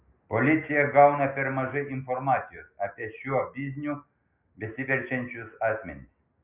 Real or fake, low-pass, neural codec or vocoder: real; 3.6 kHz; none